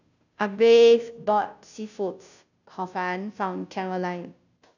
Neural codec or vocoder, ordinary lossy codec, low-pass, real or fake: codec, 16 kHz, 0.5 kbps, FunCodec, trained on Chinese and English, 25 frames a second; none; 7.2 kHz; fake